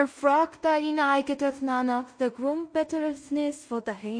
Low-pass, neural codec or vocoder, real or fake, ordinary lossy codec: 9.9 kHz; codec, 16 kHz in and 24 kHz out, 0.4 kbps, LongCat-Audio-Codec, two codebook decoder; fake; MP3, 48 kbps